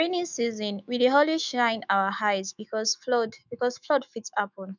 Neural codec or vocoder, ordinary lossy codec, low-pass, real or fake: autoencoder, 48 kHz, 128 numbers a frame, DAC-VAE, trained on Japanese speech; none; 7.2 kHz; fake